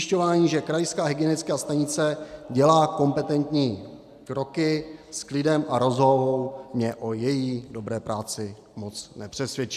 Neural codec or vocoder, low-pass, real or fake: none; 14.4 kHz; real